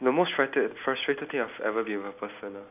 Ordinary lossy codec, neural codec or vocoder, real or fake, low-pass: none; none; real; 3.6 kHz